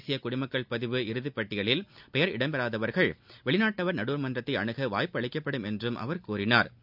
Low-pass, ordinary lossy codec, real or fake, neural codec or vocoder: 5.4 kHz; none; real; none